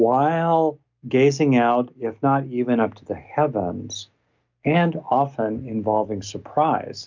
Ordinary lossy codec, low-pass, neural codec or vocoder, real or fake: MP3, 64 kbps; 7.2 kHz; none; real